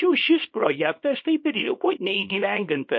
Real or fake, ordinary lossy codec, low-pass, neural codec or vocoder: fake; MP3, 32 kbps; 7.2 kHz; codec, 24 kHz, 0.9 kbps, WavTokenizer, small release